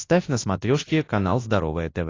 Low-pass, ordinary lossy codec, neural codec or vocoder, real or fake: 7.2 kHz; AAC, 32 kbps; codec, 24 kHz, 0.9 kbps, WavTokenizer, large speech release; fake